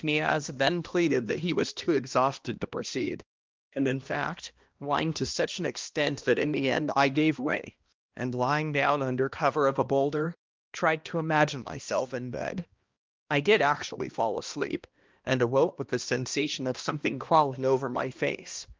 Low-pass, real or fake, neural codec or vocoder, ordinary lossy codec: 7.2 kHz; fake; codec, 16 kHz, 1 kbps, X-Codec, HuBERT features, trained on balanced general audio; Opus, 24 kbps